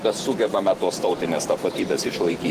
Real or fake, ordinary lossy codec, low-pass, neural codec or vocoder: fake; Opus, 16 kbps; 14.4 kHz; vocoder, 44.1 kHz, 128 mel bands every 512 samples, BigVGAN v2